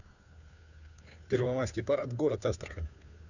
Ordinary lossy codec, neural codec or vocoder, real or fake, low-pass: none; codec, 16 kHz, 2 kbps, FunCodec, trained on Chinese and English, 25 frames a second; fake; 7.2 kHz